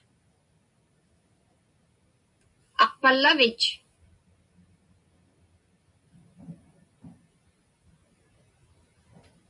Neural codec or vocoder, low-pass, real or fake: none; 10.8 kHz; real